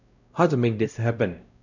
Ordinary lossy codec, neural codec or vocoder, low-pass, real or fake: none; codec, 16 kHz, 0.5 kbps, X-Codec, WavLM features, trained on Multilingual LibriSpeech; 7.2 kHz; fake